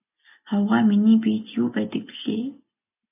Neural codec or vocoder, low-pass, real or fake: none; 3.6 kHz; real